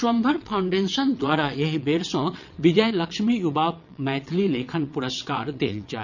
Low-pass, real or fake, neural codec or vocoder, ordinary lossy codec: 7.2 kHz; fake; vocoder, 22.05 kHz, 80 mel bands, WaveNeXt; none